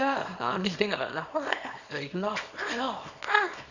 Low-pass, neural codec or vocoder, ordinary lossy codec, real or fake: 7.2 kHz; codec, 24 kHz, 0.9 kbps, WavTokenizer, small release; none; fake